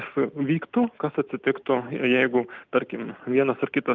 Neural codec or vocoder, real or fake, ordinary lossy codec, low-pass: none; real; Opus, 24 kbps; 7.2 kHz